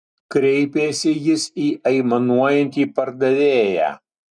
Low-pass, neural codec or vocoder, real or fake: 9.9 kHz; none; real